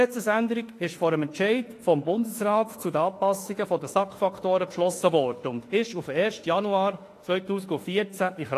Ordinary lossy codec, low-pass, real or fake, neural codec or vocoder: AAC, 48 kbps; 14.4 kHz; fake; autoencoder, 48 kHz, 32 numbers a frame, DAC-VAE, trained on Japanese speech